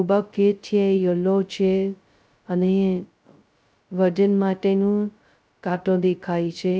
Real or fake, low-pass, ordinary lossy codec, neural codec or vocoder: fake; none; none; codec, 16 kHz, 0.2 kbps, FocalCodec